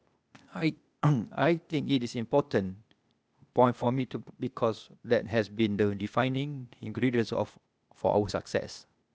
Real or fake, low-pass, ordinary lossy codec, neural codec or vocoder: fake; none; none; codec, 16 kHz, 0.8 kbps, ZipCodec